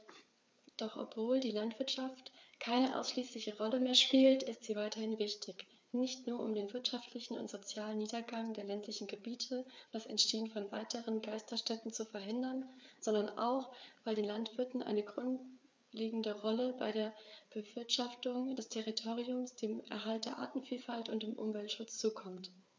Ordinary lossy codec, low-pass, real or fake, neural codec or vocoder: none; none; fake; codec, 16 kHz, 4 kbps, FreqCodec, larger model